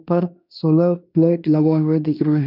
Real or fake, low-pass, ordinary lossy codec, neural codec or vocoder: fake; 5.4 kHz; none; codec, 16 kHz in and 24 kHz out, 0.9 kbps, LongCat-Audio-Codec, fine tuned four codebook decoder